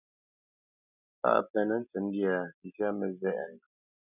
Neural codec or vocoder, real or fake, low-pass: none; real; 3.6 kHz